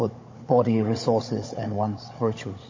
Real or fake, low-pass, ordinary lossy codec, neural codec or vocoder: fake; 7.2 kHz; MP3, 32 kbps; codec, 16 kHz, 16 kbps, FunCodec, trained on Chinese and English, 50 frames a second